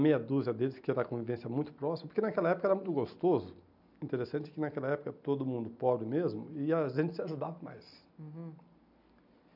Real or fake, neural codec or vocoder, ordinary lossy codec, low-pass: real; none; none; 5.4 kHz